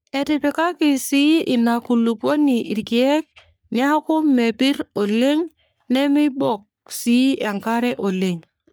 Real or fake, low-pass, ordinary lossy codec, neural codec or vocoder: fake; none; none; codec, 44.1 kHz, 3.4 kbps, Pupu-Codec